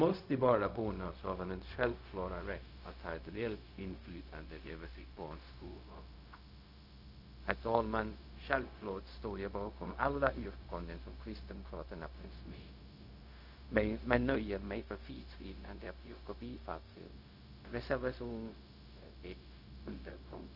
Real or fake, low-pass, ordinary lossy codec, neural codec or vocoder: fake; 5.4 kHz; none; codec, 16 kHz, 0.4 kbps, LongCat-Audio-Codec